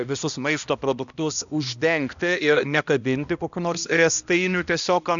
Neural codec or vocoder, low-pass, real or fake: codec, 16 kHz, 1 kbps, X-Codec, HuBERT features, trained on balanced general audio; 7.2 kHz; fake